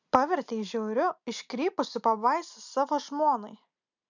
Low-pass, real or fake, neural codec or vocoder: 7.2 kHz; real; none